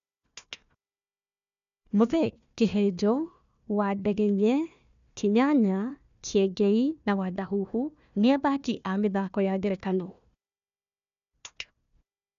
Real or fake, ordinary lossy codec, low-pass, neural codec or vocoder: fake; none; 7.2 kHz; codec, 16 kHz, 1 kbps, FunCodec, trained on Chinese and English, 50 frames a second